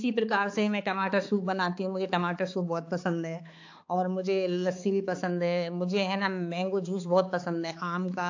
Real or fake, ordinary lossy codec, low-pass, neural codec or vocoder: fake; AAC, 48 kbps; 7.2 kHz; codec, 16 kHz, 4 kbps, X-Codec, HuBERT features, trained on balanced general audio